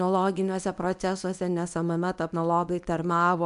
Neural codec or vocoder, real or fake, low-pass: codec, 24 kHz, 0.9 kbps, WavTokenizer, medium speech release version 1; fake; 10.8 kHz